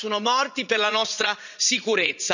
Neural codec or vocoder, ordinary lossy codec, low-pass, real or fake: vocoder, 44.1 kHz, 80 mel bands, Vocos; none; 7.2 kHz; fake